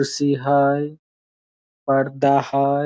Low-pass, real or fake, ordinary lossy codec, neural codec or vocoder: none; real; none; none